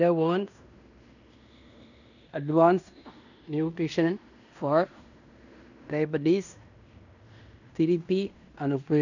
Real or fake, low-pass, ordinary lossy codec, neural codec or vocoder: fake; 7.2 kHz; none; codec, 16 kHz in and 24 kHz out, 0.9 kbps, LongCat-Audio-Codec, fine tuned four codebook decoder